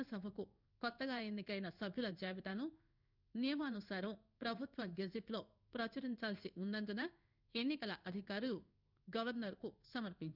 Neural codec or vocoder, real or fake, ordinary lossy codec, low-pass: codec, 16 kHz in and 24 kHz out, 1 kbps, XY-Tokenizer; fake; none; 5.4 kHz